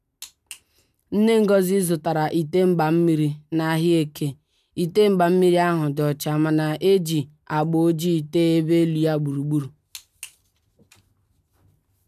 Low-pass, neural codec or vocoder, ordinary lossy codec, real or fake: 14.4 kHz; none; none; real